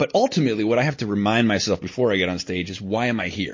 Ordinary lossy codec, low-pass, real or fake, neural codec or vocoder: MP3, 32 kbps; 7.2 kHz; real; none